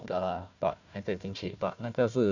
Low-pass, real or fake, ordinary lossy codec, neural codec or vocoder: 7.2 kHz; fake; none; codec, 16 kHz, 1 kbps, FunCodec, trained on Chinese and English, 50 frames a second